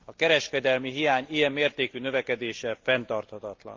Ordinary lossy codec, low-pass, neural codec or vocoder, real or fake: Opus, 32 kbps; 7.2 kHz; none; real